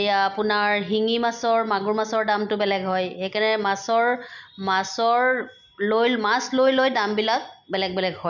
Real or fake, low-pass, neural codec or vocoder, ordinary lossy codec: real; 7.2 kHz; none; none